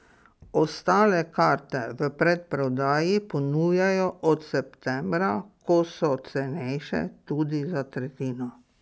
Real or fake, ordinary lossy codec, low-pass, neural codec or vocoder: real; none; none; none